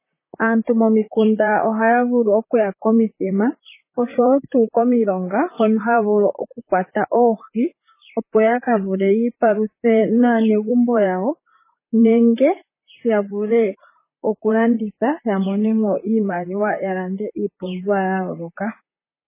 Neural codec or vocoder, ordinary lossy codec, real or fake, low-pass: vocoder, 44.1 kHz, 128 mel bands, Pupu-Vocoder; MP3, 16 kbps; fake; 3.6 kHz